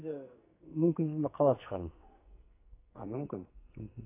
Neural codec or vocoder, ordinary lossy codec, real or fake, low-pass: codec, 44.1 kHz, 2.6 kbps, SNAC; AAC, 24 kbps; fake; 3.6 kHz